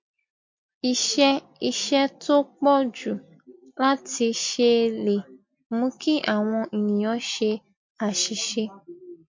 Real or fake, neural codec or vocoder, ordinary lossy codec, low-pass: real; none; MP3, 48 kbps; 7.2 kHz